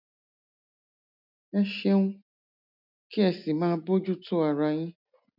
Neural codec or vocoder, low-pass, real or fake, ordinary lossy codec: none; 5.4 kHz; real; none